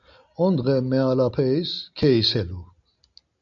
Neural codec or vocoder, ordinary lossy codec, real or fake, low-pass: none; MP3, 64 kbps; real; 7.2 kHz